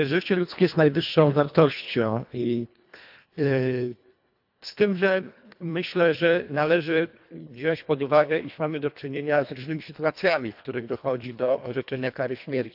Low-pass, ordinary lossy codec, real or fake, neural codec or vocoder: 5.4 kHz; AAC, 48 kbps; fake; codec, 24 kHz, 1.5 kbps, HILCodec